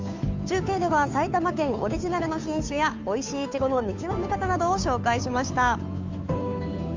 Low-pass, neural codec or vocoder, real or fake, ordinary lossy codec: 7.2 kHz; codec, 16 kHz, 2 kbps, FunCodec, trained on Chinese and English, 25 frames a second; fake; MP3, 64 kbps